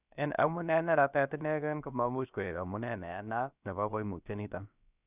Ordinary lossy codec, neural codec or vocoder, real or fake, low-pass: none; codec, 16 kHz, about 1 kbps, DyCAST, with the encoder's durations; fake; 3.6 kHz